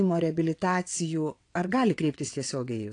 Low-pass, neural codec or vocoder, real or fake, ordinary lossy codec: 9.9 kHz; vocoder, 22.05 kHz, 80 mel bands, WaveNeXt; fake; AAC, 48 kbps